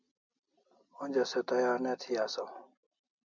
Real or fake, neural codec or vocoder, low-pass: real; none; 7.2 kHz